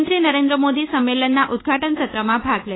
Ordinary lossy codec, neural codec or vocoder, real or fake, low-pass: AAC, 16 kbps; codec, 24 kHz, 1.2 kbps, DualCodec; fake; 7.2 kHz